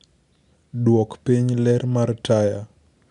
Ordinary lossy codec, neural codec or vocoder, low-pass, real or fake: none; none; 10.8 kHz; real